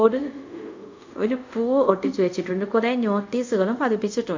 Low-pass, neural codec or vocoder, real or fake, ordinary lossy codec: 7.2 kHz; codec, 24 kHz, 0.5 kbps, DualCodec; fake; MP3, 64 kbps